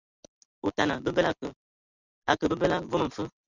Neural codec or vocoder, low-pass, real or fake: none; 7.2 kHz; real